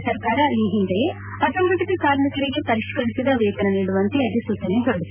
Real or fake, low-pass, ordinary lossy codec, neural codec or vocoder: real; 3.6 kHz; none; none